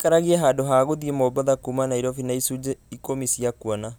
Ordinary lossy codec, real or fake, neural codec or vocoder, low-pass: none; real; none; none